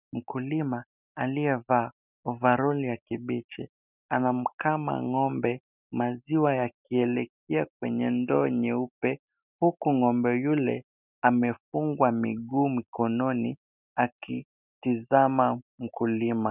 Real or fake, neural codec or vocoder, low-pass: real; none; 3.6 kHz